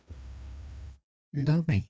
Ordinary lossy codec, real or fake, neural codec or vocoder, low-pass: none; fake; codec, 16 kHz, 2 kbps, FreqCodec, larger model; none